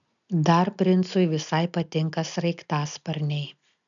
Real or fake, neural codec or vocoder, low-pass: real; none; 7.2 kHz